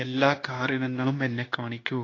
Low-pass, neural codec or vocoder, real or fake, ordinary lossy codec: 7.2 kHz; codec, 16 kHz, 0.9 kbps, LongCat-Audio-Codec; fake; AAC, 32 kbps